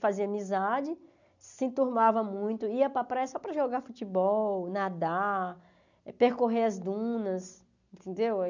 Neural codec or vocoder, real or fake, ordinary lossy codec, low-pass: none; real; none; 7.2 kHz